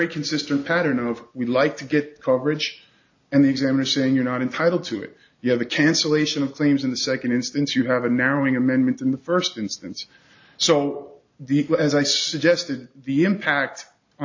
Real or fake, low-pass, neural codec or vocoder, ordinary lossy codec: real; 7.2 kHz; none; AAC, 48 kbps